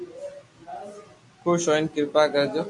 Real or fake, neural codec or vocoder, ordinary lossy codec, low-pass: real; none; AAC, 64 kbps; 10.8 kHz